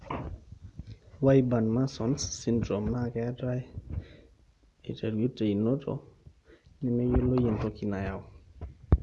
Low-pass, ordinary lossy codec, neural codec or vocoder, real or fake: none; none; none; real